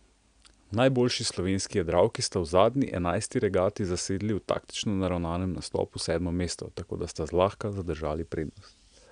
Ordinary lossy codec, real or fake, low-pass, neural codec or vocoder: none; real; 9.9 kHz; none